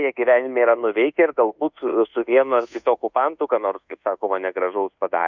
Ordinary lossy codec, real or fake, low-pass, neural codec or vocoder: Opus, 64 kbps; fake; 7.2 kHz; codec, 24 kHz, 1.2 kbps, DualCodec